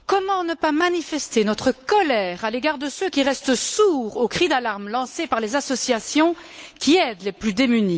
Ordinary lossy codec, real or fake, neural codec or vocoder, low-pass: none; fake; codec, 16 kHz, 8 kbps, FunCodec, trained on Chinese and English, 25 frames a second; none